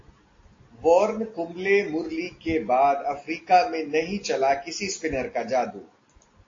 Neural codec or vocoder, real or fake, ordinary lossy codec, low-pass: none; real; AAC, 32 kbps; 7.2 kHz